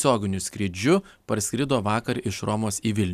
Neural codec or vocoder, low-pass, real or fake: none; 14.4 kHz; real